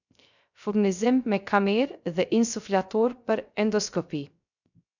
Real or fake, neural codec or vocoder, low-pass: fake; codec, 16 kHz, 0.3 kbps, FocalCodec; 7.2 kHz